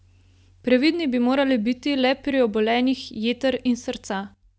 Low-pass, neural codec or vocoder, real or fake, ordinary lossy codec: none; none; real; none